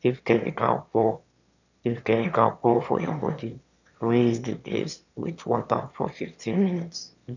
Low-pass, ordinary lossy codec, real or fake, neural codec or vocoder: 7.2 kHz; none; fake; autoencoder, 22.05 kHz, a latent of 192 numbers a frame, VITS, trained on one speaker